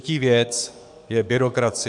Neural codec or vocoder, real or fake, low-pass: codec, 44.1 kHz, 7.8 kbps, DAC; fake; 10.8 kHz